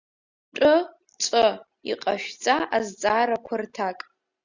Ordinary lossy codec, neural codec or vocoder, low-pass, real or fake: Opus, 64 kbps; none; 7.2 kHz; real